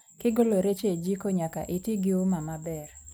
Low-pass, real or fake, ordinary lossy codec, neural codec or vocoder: none; real; none; none